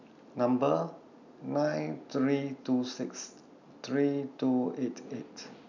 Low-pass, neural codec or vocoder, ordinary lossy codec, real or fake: 7.2 kHz; none; none; real